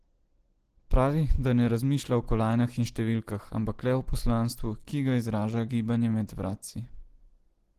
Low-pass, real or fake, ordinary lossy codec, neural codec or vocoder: 14.4 kHz; real; Opus, 16 kbps; none